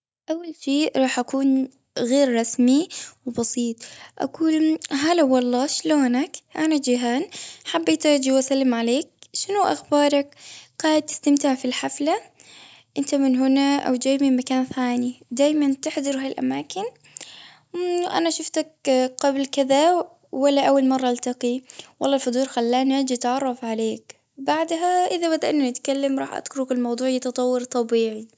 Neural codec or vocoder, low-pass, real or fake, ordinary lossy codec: none; none; real; none